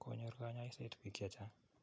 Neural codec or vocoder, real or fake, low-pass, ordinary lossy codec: none; real; none; none